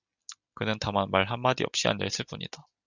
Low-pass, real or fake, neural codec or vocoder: 7.2 kHz; real; none